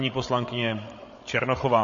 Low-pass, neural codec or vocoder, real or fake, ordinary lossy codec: 7.2 kHz; codec, 16 kHz, 16 kbps, FreqCodec, larger model; fake; MP3, 32 kbps